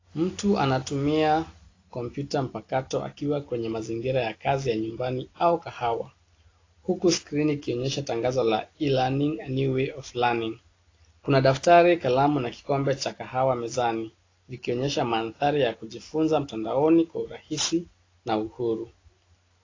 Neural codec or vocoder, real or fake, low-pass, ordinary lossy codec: none; real; 7.2 kHz; AAC, 32 kbps